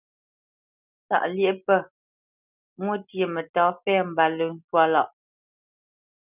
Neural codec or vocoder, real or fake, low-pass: none; real; 3.6 kHz